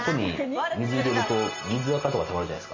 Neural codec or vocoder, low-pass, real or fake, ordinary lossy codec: none; 7.2 kHz; real; MP3, 32 kbps